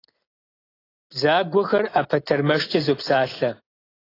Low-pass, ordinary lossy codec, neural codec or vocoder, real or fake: 5.4 kHz; AAC, 24 kbps; none; real